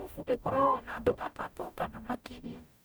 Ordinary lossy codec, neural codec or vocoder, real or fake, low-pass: none; codec, 44.1 kHz, 0.9 kbps, DAC; fake; none